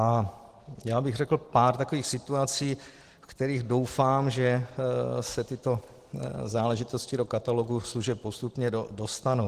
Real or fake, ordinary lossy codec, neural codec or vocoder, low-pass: real; Opus, 16 kbps; none; 14.4 kHz